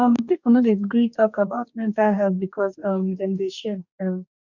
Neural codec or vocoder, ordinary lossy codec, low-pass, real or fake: codec, 44.1 kHz, 2.6 kbps, DAC; none; 7.2 kHz; fake